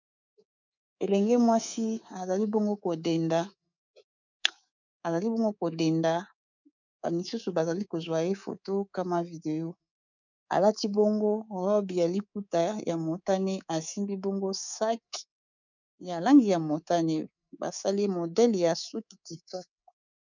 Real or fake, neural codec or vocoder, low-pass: fake; codec, 24 kHz, 3.1 kbps, DualCodec; 7.2 kHz